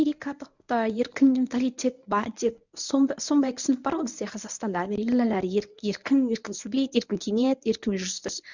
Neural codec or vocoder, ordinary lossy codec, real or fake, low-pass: codec, 24 kHz, 0.9 kbps, WavTokenizer, medium speech release version 1; none; fake; 7.2 kHz